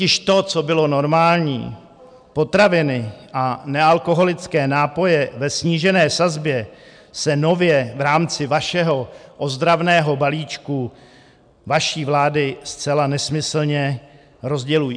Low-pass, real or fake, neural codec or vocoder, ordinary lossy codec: 9.9 kHz; real; none; MP3, 96 kbps